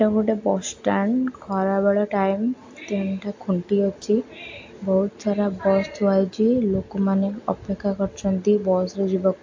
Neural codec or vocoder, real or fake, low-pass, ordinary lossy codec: none; real; 7.2 kHz; AAC, 48 kbps